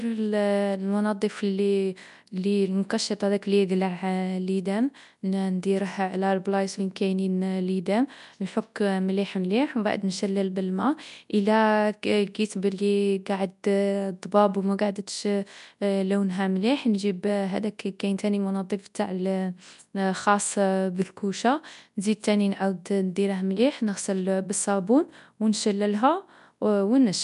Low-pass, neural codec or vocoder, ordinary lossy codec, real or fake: 10.8 kHz; codec, 24 kHz, 0.9 kbps, WavTokenizer, large speech release; none; fake